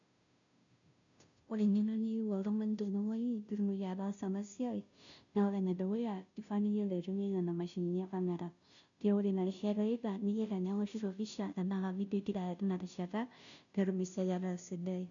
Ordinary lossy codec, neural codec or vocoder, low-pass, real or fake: none; codec, 16 kHz, 0.5 kbps, FunCodec, trained on Chinese and English, 25 frames a second; 7.2 kHz; fake